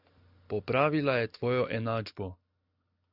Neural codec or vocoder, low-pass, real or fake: none; 5.4 kHz; real